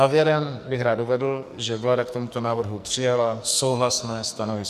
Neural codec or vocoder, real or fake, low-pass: codec, 44.1 kHz, 2.6 kbps, SNAC; fake; 14.4 kHz